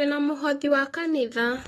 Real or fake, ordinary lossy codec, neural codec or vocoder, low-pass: fake; AAC, 32 kbps; autoencoder, 48 kHz, 128 numbers a frame, DAC-VAE, trained on Japanese speech; 19.8 kHz